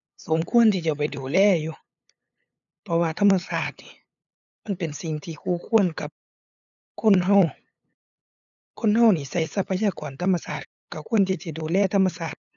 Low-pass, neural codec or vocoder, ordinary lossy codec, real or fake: 7.2 kHz; codec, 16 kHz, 8 kbps, FunCodec, trained on LibriTTS, 25 frames a second; none; fake